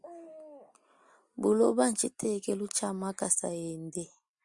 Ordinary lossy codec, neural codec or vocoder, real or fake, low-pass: Opus, 64 kbps; none; real; 10.8 kHz